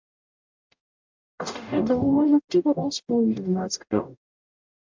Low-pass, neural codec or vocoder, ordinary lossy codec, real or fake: 7.2 kHz; codec, 44.1 kHz, 0.9 kbps, DAC; MP3, 64 kbps; fake